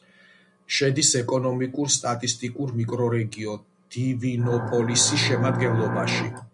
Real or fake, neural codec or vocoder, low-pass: real; none; 10.8 kHz